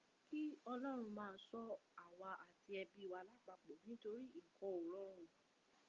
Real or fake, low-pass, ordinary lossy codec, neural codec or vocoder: real; 7.2 kHz; Opus, 32 kbps; none